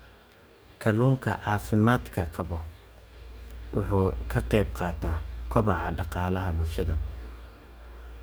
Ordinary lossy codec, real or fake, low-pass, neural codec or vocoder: none; fake; none; codec, 44.1 kHz, 2.6 kbps, DAC